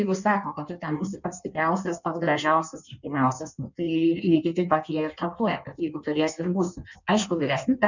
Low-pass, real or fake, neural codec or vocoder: 7.2 kHz; fake; codec, 16 kHz in and 24 kHz out, 1.1 kbps, FireRedTTS-2 codec